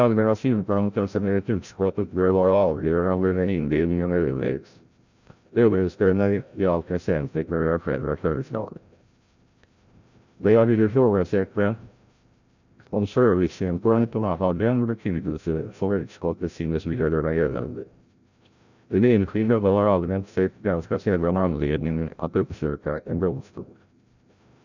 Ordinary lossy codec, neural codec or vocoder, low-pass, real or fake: none; codec, 16 kHz, 0.5 kbps, FreqCodec, larger model; 7.2 kHz; fake